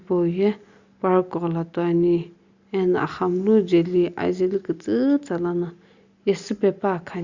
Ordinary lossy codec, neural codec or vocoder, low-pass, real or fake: Opus, 64 kbps; none; 7.2 kHz; real